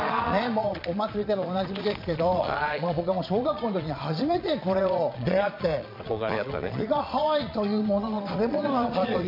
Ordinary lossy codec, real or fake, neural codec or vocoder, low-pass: MP3, 48 kbps; fake; vocoder, 22.05 kHz, 80 mel bands, Vocos; 5.4 kHz